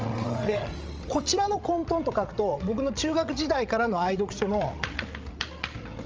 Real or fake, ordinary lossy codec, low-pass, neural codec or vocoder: fake; Opus, 24 kbps; 7.2 kHz; codec, 16 kHz, 16 kbps, FreqCodec, larger model